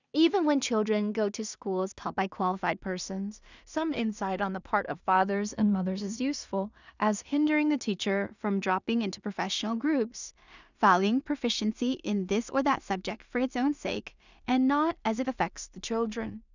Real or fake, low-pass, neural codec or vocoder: fake; 7.2 kHz; codec, 16 kHz in and 24 kHz out, 0.4 kbps, LongCat-Audio-Codec, two codebook decoder